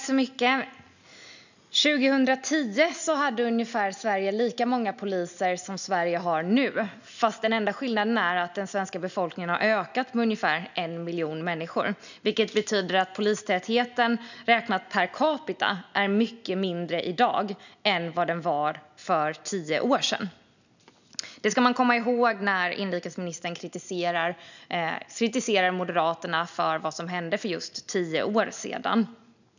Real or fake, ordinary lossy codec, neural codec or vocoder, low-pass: real; none; none; 7.2 kHz